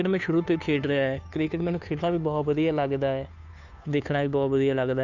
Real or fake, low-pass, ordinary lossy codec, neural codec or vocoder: fake; 7.2 kHz; none; codec, 16 kHz, 2 kbps, FunCodec, trained on Chinese and English, 25 frames a second